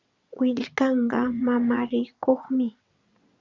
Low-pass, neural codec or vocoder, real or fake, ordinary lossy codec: 7.2 kHz; vocoder, 22.05 kHz, 80 mel bands, WaveNeXt; fake; Opus, 64 kbps